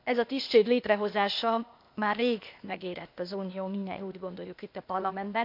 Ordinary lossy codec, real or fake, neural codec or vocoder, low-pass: MP3, 48 kbps; fake; codec, 16 kHz, 0.8 kbps, ZipCodec; 5.4 kHz